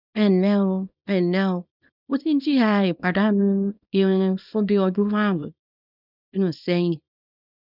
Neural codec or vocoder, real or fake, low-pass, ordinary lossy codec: codec, 24 kHz, 0.9 kbps, WavTokenizer, small release; fake; 5.4 kHz; none